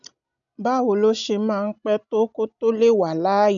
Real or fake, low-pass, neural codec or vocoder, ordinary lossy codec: real; 7.2 kHz; none; none